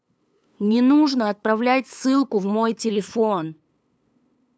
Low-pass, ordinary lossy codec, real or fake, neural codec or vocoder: none; none; fake; codec, 16 kHz, 8 kbps, FunCodec, trained on LibriTTS, 25 frames a second